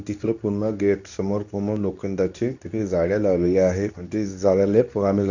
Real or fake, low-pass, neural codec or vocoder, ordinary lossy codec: fake; 7.2 kHz; codec, 24 kHz, 0.9 kbps, WavTokenizer, medium speech release version 2; none